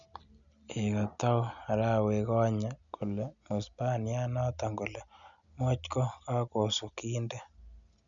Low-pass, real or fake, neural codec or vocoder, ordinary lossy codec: 7.2 kHz; real; none; none